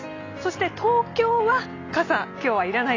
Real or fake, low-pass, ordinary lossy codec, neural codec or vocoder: real; 7.2 kHz; AAC, 32 kbps; none